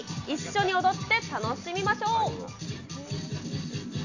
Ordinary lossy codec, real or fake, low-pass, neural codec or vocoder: none; real; 7.2 kHz; none